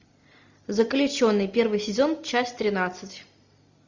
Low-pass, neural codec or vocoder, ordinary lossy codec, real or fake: 7.2 kHz; none; Opus, 64 kbps; real